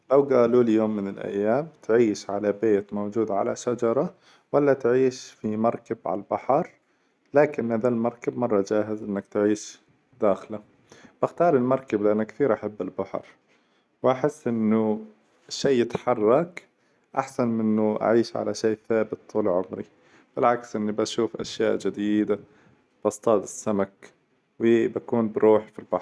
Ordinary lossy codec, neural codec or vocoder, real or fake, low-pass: none; none; real; none